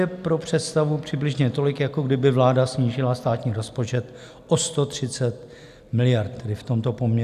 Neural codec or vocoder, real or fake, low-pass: none; real; 14.4 kHz